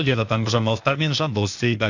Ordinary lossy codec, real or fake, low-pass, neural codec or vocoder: none; fake; 7.2 kHz; codec, 16 kHz, 0.8 kbps, ZipCodec